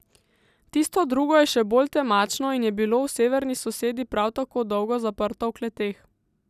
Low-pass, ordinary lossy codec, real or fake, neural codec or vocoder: 14.4 kHz; none; real; none